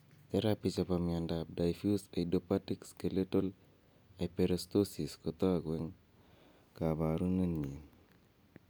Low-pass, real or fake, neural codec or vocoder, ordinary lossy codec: none; real; none; none